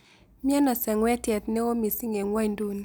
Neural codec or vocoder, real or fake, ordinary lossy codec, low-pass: none; real; none; none